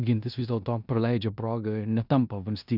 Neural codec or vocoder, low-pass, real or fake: codec, 16 kHz in and 24 kHz out, 0.9 kbps, LongCat-Audio-Codec, four codebook decoder; 5.4 kHz; fake